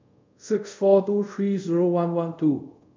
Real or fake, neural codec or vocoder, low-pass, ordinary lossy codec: fake; codec, 24 kHz, 0.5 kbps, DualCodec; 7.2 kHz; MP3, 64 kbps